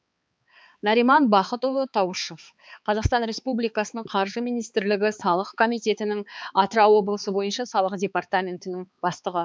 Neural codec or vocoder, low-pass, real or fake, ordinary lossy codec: codec, 16 kHz, 4 kbps, X-Codec, HuBERT features, trained on balanced general audio; none; fake; none